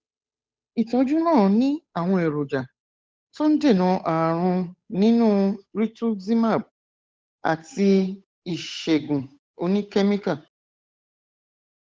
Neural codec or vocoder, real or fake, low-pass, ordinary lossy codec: codec, 16 kHz, 8 kbps, FunCodec, trained on Chinese and English, 25 frames a second; fake; none; none